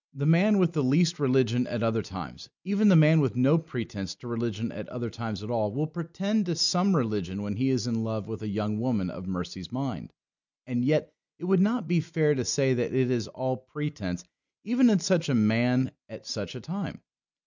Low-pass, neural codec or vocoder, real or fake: 7.2 kHz; none; real